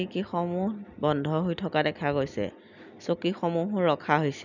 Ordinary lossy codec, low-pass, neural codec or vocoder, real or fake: none; 7.2 kHz; none; real